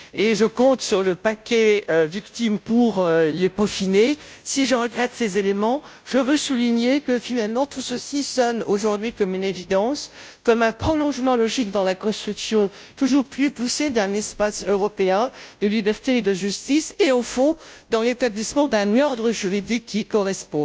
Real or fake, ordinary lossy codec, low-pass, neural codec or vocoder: fake; none; none; codec, 16 kHz, 0.5 kbps, FunCodec, trained on Chinese and English, 25 frames a second